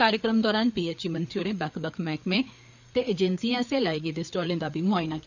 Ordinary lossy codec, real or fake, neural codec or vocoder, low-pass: none; fake; codec, 16 kHz, 4 kbps, FreqCodec, larger model; 7.2 kHz